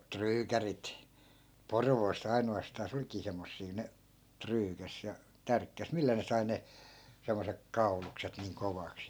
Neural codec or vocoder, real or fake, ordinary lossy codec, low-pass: none; real; none; none